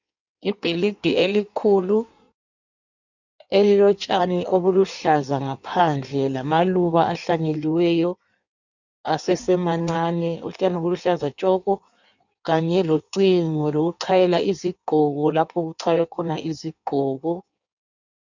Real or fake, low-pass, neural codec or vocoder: fake; 7.2 kHz; codec, 16 kHz in and 24 kHz out, 1.1 kbps, FireRedTTS-2 codec